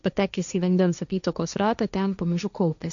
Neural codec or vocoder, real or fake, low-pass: codec, 16 kHz, 1.1 kbps, Voila-Tokenizer; fake; 7.2 kHz